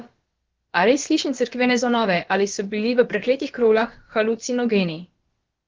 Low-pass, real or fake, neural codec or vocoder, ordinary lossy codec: 7.2 kHz; fake; codec, 16 kHz, about 1 kbps, DyCAST, with the encoder's durations; Opus, 16 kbps